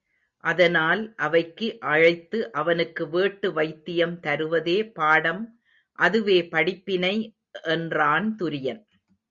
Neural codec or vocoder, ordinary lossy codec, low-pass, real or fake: none; Opus, 64 kbps; 7.2 kHz; real